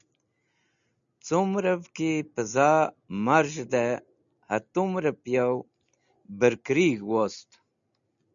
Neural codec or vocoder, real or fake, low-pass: none; real; 7.2 kHz